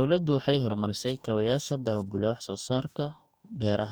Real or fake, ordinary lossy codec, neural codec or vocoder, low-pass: fake; none; codec, 44.1 kHz, 2.6 kbps, DAC; none